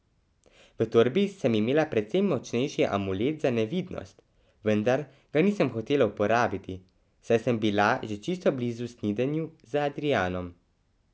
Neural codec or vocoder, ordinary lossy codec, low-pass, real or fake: none; none; none; real